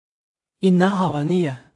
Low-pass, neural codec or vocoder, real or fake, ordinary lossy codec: 10.8 kHz; codec, 16 kHz in and 24 kHz out, 0.4 kbps, LongCat-Audio-Codec, two codebook decoder; fake; AAC, 64 kbps